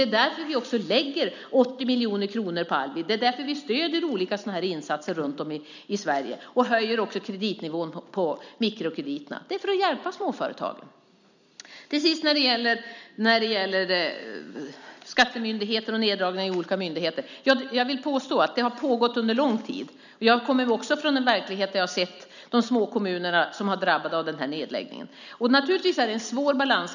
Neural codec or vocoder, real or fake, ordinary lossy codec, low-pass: none; real; none; 7.2 kHz